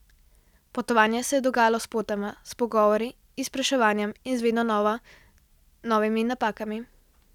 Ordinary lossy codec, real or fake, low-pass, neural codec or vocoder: none; real; 19.8 kHz; none